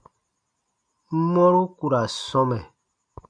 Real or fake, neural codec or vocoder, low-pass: real; none; 9.9 kHz